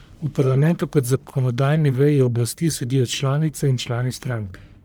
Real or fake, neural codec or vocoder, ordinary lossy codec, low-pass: fake; codec, 44.1 kHz, 1.7 kbps, Pupu-Codec; none; none